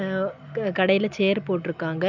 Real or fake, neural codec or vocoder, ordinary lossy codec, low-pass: real; none; none; 7.2 kHz